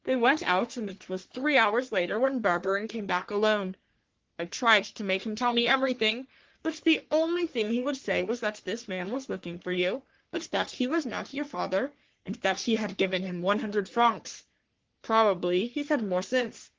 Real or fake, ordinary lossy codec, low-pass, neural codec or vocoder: fake; Opus, 16 kbps; 7.2 kHz; codec, 44.1 kHz, 3.4 kbps, Pupu-Codec